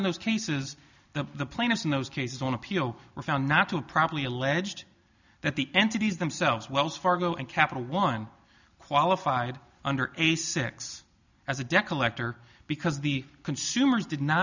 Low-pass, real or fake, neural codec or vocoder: 7.2 kHz; real; none